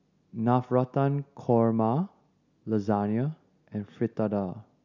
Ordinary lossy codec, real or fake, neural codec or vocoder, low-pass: none; real; none; 7.2 kHz